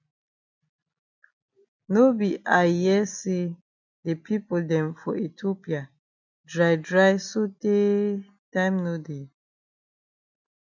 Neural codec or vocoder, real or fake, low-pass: none; real; 7.2 kHz